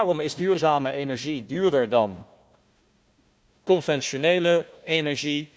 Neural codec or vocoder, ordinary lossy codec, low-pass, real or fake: codec, 16 kHz, 1 kbps, FunCodec, trained on Chinese and English, 50 frames a second; none; none; fake